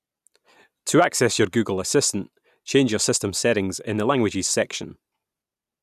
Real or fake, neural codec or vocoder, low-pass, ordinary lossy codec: real; none; 14.4 kHz; none